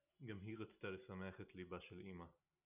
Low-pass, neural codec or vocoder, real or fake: 3.6 kHz; none; real